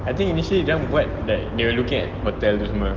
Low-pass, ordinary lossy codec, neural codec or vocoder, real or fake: 7.2 kHz; Opus, 16 kbps; none; real